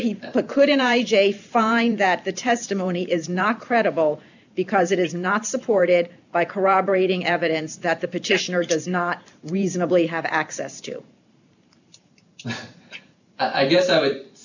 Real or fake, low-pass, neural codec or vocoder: fake; 7.2 kHz; vocoder, 44.1 kHz, 128 mel bands every 512 samples, BigVGAN v2